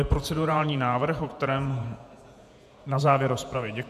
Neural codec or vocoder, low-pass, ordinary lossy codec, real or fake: vocoder, 48 kHz, 128 mel bands, Vocos; 14.4 kHz; MP3, 96 kbps; fake